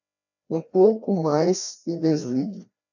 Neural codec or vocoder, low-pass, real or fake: codec, 16 kHz, 1 kbps, FreqCodec, larger model; 7.2 kHz; fake